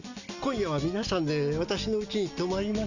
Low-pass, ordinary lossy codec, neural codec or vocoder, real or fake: 7.2 kHz; none; none; real